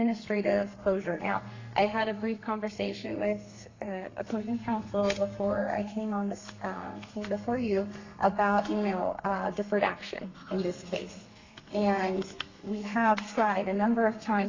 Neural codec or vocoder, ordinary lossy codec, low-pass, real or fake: codec, 32 kHz, 1.9 kbps, SNAC; AAC, 32 kbps; 7.2 kHz; fake